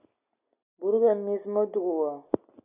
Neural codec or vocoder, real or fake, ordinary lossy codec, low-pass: none; real; AAC, 32 kbps; 3.6 kHz